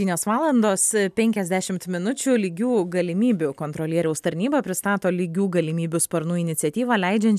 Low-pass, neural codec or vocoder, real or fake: 14.4 kHz; none; real